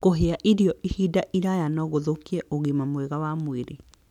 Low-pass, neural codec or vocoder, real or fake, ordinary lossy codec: 19.8 kHz; none; real; none